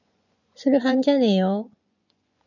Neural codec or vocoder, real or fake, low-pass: vocoder, 24 kHz, 100 mel bands, Vocos; fake; 7.2 kHz